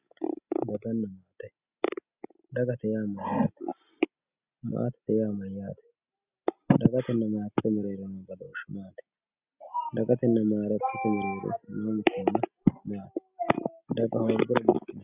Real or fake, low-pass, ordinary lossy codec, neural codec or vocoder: real; 3.6 kHz; Opus, 64 kbps; none